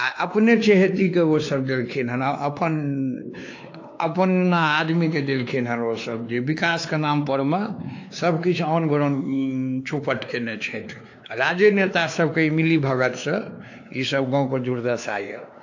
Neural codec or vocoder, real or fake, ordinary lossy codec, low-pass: codec, 16 kHz, 2 kbps, X-Codec, WavLM features, trained on Multilingual LibriSpeech; fake; AAC, 48 kbps; 7.2 kHz